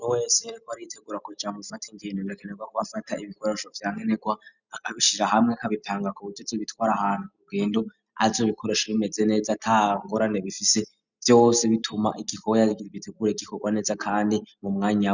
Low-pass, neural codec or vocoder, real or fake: 7.2 kHz; none; real